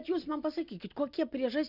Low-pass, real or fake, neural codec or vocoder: 5.4 kHz; real; none